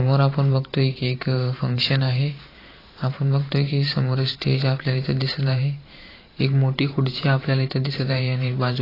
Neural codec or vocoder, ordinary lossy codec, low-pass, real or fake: vocoder, 44.1 kHz, 128 mel bands every 512 samples, BigVGAN v2; AAC, 24 kbps; 5.4 kHz; fake